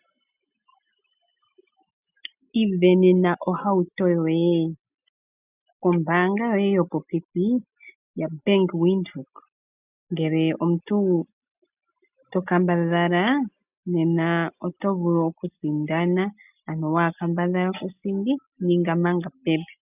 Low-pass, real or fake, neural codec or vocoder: 3.6 kHz; real; none